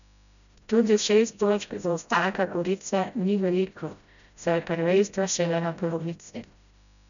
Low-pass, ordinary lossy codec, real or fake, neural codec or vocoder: 7.2 kHz; none; fake; codec, 16 kHz, 0.5 kbps, FreqCodec, smaller model